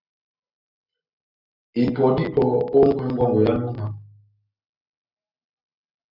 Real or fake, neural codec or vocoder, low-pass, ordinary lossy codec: real; none; 5.4 kHz; Opus, 64 kbps